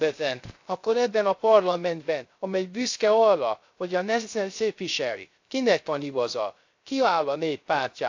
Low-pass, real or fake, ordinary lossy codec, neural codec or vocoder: 7.2 kHz; fake; AAC, 48 kbps; codec, 16 kHz, 0.3 kbps, FocalCodec